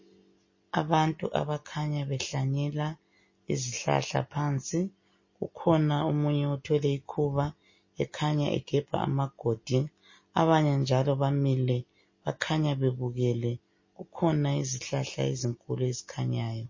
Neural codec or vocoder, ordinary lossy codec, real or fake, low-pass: none; MP3, 32 kbps; real; 7.2 kHz